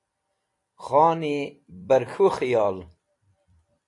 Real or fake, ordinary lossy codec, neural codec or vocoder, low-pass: fake; AAC, 48 kbps; vocoder, 44.1 kHz, 128 mel bands every 256 samples, BigVGAN v2; 10.8 kHz